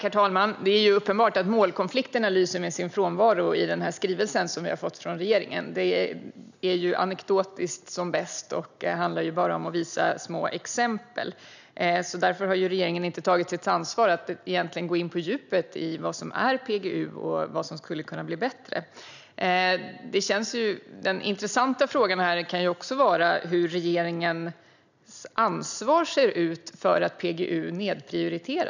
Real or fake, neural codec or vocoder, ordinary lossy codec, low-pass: real; none; none; 7.2 kHz